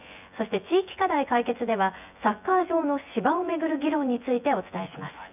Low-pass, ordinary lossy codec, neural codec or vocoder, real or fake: 3.6 kHz; none; vocoder, 24 kHz, 100 mel bands, Vocos; fake